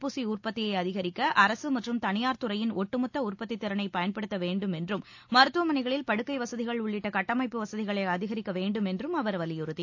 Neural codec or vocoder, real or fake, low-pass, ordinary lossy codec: none; real; 7.2 kHz; AAC, 48 kbps